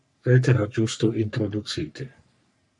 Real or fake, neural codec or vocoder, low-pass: fake; codec, 44.1 kHz, 3.4 kbps, Pupu-Codec; 10.8 kHz